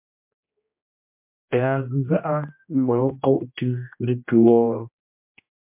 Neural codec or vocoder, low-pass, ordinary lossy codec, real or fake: codec, 16 kHz, 1 kbps, X-Codec, HuBERT features, trained on general audio; 3.6 kHz; MP3, 32 kbps; fake